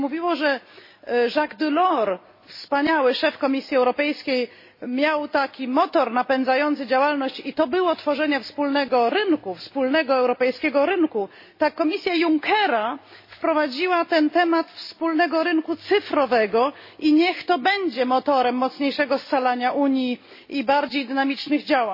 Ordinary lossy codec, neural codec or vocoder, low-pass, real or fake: MP3, 24 kbps; none; 5.4 kHz; real